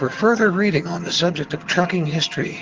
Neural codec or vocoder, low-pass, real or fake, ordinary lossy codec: vocoder, 22.05 kHz, 80 mel bands, HiFi-GAN; 7.2 kHz; fake; Opus, 16 kbps